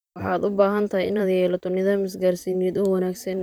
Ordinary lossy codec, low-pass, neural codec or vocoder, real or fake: none; none; vocoder, 44.1 kHz, 128 mel bands, Pupu-Vocoder; fake